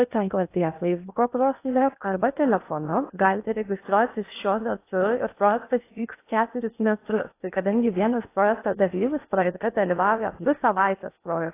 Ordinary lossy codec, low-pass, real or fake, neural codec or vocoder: AAC, 24 kbps; 3.6 kHz; fake; codec, 16 kHz in and 24 kHz out, 0.6 kbps, FocalCodec, streaming, 2048 codes